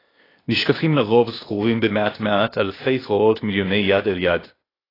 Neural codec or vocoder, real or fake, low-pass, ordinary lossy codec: codec, 16 kHz, 0.8 kbps, ZipCodec; fake; 5.4 kHz; AAC, 24 kbps